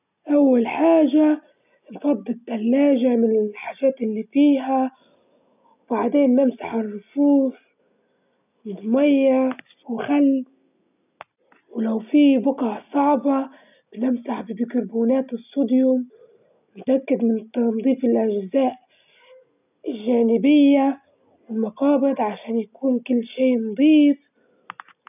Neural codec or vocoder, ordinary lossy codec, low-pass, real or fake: none; none; 3.6 kHz; real